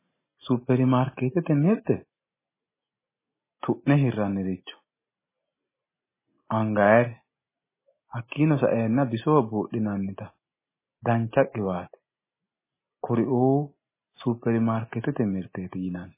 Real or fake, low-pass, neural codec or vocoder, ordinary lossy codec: real; 3.6 kHz; none; MP3, 16 kbps